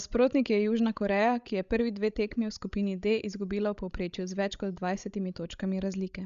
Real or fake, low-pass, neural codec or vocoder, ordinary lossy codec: fake; 7.2 kHz; codec, 16 kHz, 16 kbps, FreqCodec, larger model; Opus, 64 kbps